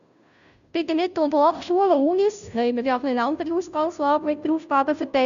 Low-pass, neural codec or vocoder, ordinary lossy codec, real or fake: 7.2 kHz; codec, 16 kHz, 0.5 kbps, FunCodec, trained on Chinese and English, 25 frames a second; none; fake